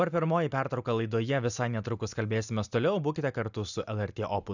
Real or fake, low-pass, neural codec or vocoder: real; 7.2 kHz; none